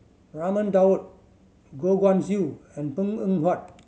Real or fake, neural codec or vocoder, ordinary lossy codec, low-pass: real; none; none; none